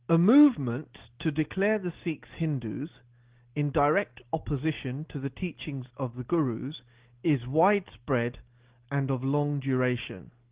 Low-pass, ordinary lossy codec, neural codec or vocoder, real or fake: 3.6 kHz; Opus, 16 kbps; none; real